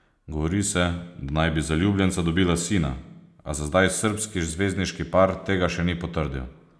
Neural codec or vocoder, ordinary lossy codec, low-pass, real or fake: none; none; none; real